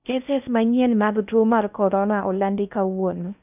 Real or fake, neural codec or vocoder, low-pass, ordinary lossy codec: fake; codec, 16 kHz in and 24 kHz out, 0.6 kbps, FocalCodec, streaming, 4096 codes; 3.6 kHz; none